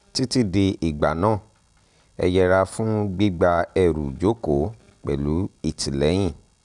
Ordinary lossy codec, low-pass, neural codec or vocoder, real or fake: none; 10.8 kHz; none; real